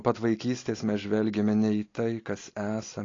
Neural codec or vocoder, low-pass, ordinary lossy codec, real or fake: none; 7.2 kHz; AAC, 32 kbps; real